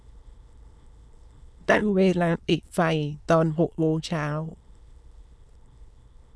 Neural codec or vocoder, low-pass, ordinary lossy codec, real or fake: autoencoder, 22.05 kHz, a latent of 192 numbers a frame, VITS, trained on many speakers; none; none; fake